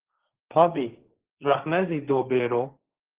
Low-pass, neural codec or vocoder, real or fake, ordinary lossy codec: 3.6 kHz; codec, 16 kHz, 1.1 kbps, Voila-Tokenizer; fake; Opus, 16 kbps